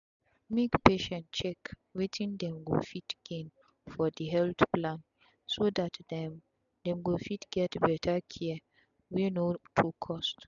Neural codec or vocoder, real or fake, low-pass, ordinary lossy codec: none; real; 7.2 kHz; none